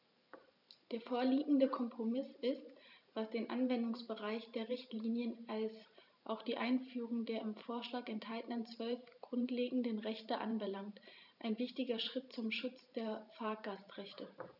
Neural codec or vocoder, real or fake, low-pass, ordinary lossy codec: vocoder, 44.1 kHz, 128 mel bands every 512 samples, BigVGAN v2; fake; 5.4 kHz; none